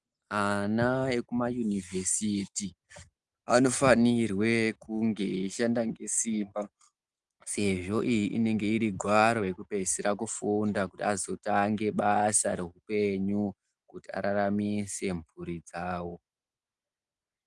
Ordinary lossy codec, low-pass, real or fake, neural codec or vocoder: Opus, 24 kbps; 10.8 kHz; real; none